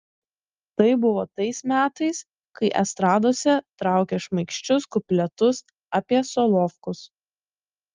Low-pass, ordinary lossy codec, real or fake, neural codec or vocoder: 7.2 kHz; Opus, 32 kbps; real; none